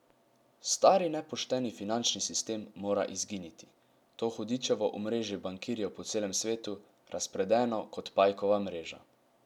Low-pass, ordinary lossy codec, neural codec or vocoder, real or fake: 19.8 kHz; none; none; real